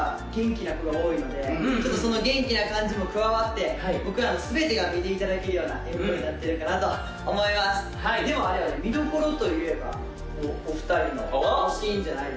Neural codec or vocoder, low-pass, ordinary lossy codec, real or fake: none; none; none; real